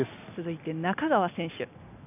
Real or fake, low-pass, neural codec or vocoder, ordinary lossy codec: real; 3.6 kHz; none; none